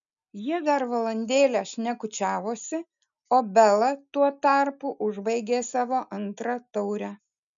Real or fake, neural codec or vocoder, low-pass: real; none; 7.2 kHz